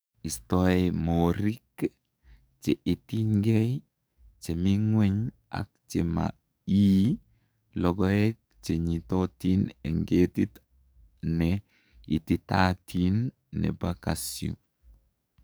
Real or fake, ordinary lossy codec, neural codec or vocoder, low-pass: fake; none; codec, 44.1 kHz, 7.8 kbps, DAC; none